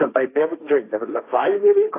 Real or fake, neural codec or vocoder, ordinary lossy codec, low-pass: fake; codec, 16 kHz, 1.1 kbps, Voila-Tokenizer; AAC, 24 kbps; 3.6 kHz